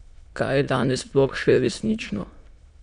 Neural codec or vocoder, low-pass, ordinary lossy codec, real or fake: autoencoder, 22.05 kHz, a latent of 192 numbers a frame, VITS, trained on many speakers; 9.9 kHz; none; fake